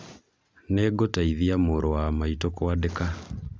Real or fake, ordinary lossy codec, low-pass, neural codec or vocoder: real; none; none; none